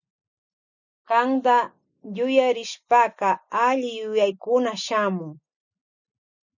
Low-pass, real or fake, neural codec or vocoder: 7.2 kHz; real; none